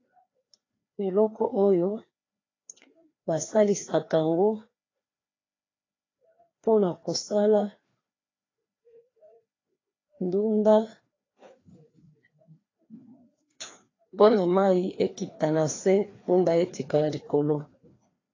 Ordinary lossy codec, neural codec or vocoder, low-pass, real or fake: AAC, 32 kbps; codec, 16 kHz, 2 kbps, FreqCodec, larger model; 7.2 kHz; fake